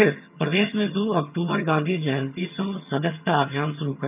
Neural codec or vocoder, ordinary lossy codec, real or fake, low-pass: vocoder, 22.05 kHz, 80 mel bands, HiFi-GAN; none; fake; 3.6 kHz